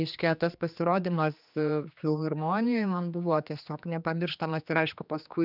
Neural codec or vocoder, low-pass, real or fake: codec, 16 kHz, 2 kbps, X-Codec, HuBERT features, trained on general audio; 5.4 kHz; fake